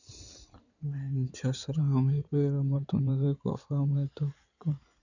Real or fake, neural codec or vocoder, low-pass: fake; codec, 16 kHz in and 24 kHz out, 2.2 kbps, FireRedTTS-2 codec; 7.2 kHz